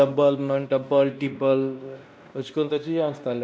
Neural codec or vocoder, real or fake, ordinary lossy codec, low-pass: codec, 16 kHz, 1 kbps, X-Codec, WavLM features, trained on Multilingual LibriSpeech; fake; none; none